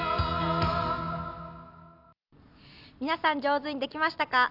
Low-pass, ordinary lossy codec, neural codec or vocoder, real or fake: 5.4 kHz; none; none; real